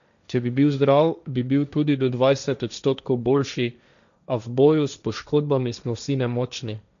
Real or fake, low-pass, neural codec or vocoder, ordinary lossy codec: fake; 7.2 kHz; codec, 16 kHz, 1.1 kbps, Voila-Tokenizer; none